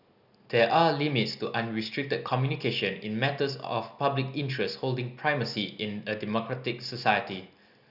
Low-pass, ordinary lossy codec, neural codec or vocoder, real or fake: 5.4 kHz; none; none; real